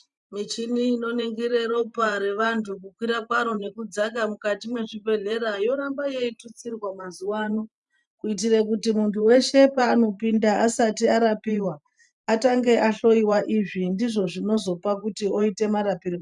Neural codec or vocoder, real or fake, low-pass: vocoder, 44.1 kHz, 128 mel bands every 512 samples, BigVGAN v2; fake; 10.8 kHz